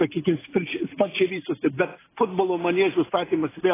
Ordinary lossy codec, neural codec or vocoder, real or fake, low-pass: AAC, 16 kbps; none; real; 3.6 kHz